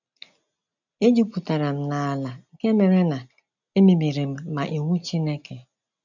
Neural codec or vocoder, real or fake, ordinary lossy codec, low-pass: none; real; none; 7.2 kHz